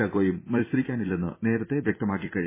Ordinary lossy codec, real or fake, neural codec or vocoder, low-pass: MP3, 16 kbps; fake; vocoder, 44.1 kHz, 128 mel bands every 256 samples, BigVGAN v2; 3.6 kHz